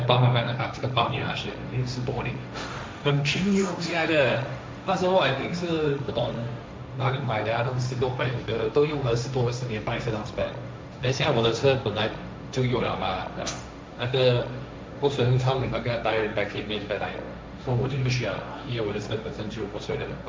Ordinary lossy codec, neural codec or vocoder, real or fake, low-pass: none; codec, 16 kHz, 1.1 kbps, Voila-Tokenizer; fake; none